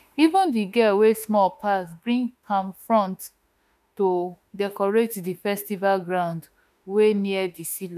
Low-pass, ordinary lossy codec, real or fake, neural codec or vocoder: 14.4 kHz; none; fake; autoencoder, 48 kHz, 32 numbers a frame, DAC-VAE, trained on Japanese speech